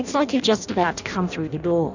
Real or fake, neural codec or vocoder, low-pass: fake; codec, 16 kHz in and 24 kHz out, 0.6 kbps, FireRedTTS-2 codec; 7.2 kHz